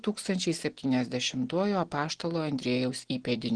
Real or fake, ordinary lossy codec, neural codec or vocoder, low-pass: real; Opus, 16 kbps; none; 9.9 kHz